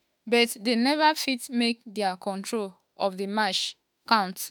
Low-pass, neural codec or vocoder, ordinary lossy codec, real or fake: none; autoencoder, 48 kHz, 32 numbers a frame, DAC-VAE, trained on Japanese speech; none; fake